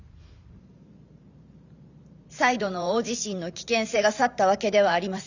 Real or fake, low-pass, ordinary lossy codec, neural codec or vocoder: fake; 7.2 kHz; none; vocoder, 44.1 kHz, 128 mel bands every 512 samples, BigVGAN v2